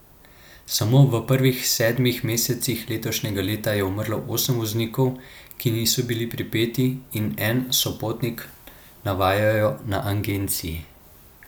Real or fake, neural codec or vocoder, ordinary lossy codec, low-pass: real; none; none; none